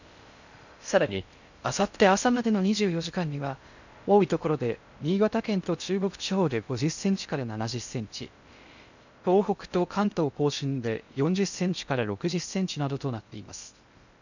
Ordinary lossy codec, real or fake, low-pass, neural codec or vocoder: none; fake; 7.2 kHz; codec, 16 kHz in and 24 kHz out, 0.6 kbps, FocalCodec, streaming, 2048 codes